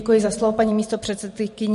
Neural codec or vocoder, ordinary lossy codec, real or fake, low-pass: vocoder, 44.1 kHz, 128 mel bands every 512 samples, BigVGAN v2; MP3, 48 kbps; fake; 14.4 kHz